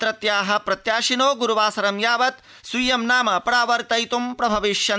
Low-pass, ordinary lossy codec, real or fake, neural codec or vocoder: none; none; real; none